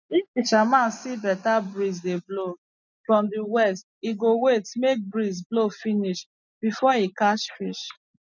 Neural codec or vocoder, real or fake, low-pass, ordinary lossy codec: none; real; 7.2 kHz; none